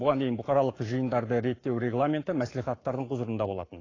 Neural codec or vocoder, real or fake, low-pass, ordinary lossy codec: codec, 44.1 kHz, 7.8 kbps, Pupu-Codec; fake; 7.2 kHz; AAC, 32 kbps